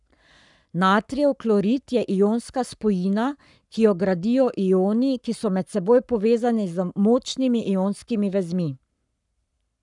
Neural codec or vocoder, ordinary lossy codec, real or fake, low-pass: codec, 44.1 kHz, 7.8 kbps, Pupu-Codec; none; fake; 10.8 kHz